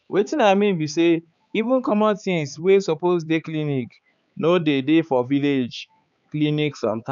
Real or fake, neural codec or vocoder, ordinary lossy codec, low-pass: fake; codec, 16 kHz, 4 kbps, X-Codec, HuBERT features, trained on balanced general audio; none; 7.2 kHz